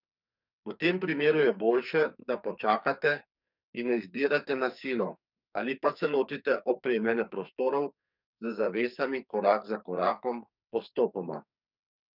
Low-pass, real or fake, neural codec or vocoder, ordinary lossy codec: 5.4 kHz; fake; codec, 44.1 kHz, 2.6 kbps, SNAC; none